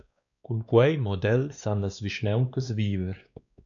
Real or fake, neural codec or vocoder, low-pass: fake; codec, 16 kHz, 2 kbps, X-Codec, WavLM features, trained on Multilingual LibriSpeech; 7.2 kHz